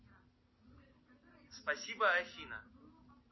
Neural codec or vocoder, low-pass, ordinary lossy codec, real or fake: none; 7.2 kHz; MP3, 24 kbps; real